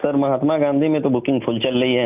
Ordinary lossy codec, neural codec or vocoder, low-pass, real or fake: none; none; 3.6 kHz; real